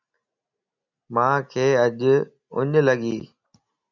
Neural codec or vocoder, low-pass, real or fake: none; 7.2 kHz; real